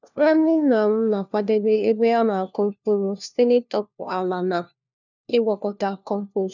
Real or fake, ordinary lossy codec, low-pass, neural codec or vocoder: fake; none; 7.2 kHz; codec, 16 kHz, 1 kbps, FunCodec, trained on LibriTTS, 50 frames a second